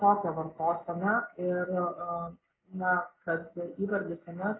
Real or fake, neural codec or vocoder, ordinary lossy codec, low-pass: real; none; AAC, 16 kbps; 7.2 kHz